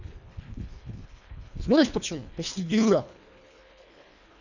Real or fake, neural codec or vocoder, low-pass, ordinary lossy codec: fake; codec, 24 kHz, 1.5 kbps, HILCodec; 7.2 kHz; none